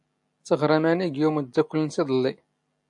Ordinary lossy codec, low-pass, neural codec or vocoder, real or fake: AAC, 64 kbps; 10.8 kHz; none; real